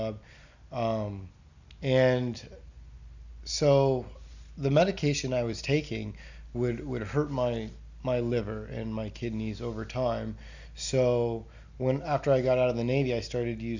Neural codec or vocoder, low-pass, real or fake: none; 7.2 kHz; real